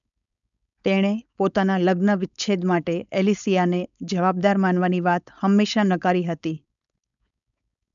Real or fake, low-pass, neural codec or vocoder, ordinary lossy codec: fake; 7.2 kHz; codec, 16 kHz, 4.8 kbps, FACodec; none